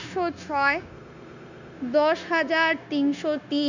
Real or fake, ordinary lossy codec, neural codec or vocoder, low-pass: fake; none; codec, 16 kHz, 0.9 kbps, LongCat-Audio-Codec; 7.2 kHz